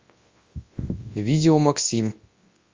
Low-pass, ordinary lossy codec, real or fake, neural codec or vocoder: 7.2 kHz; Opus, 32 kbps; fake; codec, 24 kHz, 0.9 kbps, WavTokenizer, large speech release